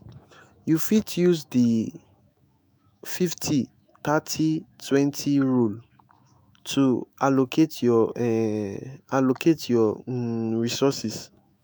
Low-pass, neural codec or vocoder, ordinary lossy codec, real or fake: none; autoencoder, 48 kHz, 128 numbers a frame, DAC-VAE, trained on Japanese speech; none; fake